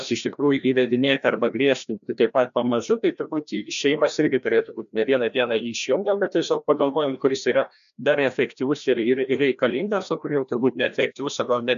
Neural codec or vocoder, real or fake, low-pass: codec, 16 kHz, 1 kbps, FreqCodec, larger model; fake; 7.2 kHz